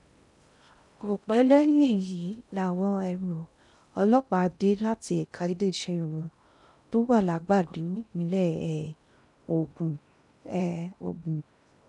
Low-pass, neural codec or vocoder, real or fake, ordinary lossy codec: 10.8 kHz; codec, 16 kHz in and 24 kHz out, 0.6 kbps, FocalCodec, streaming, 2048 codes; fake; none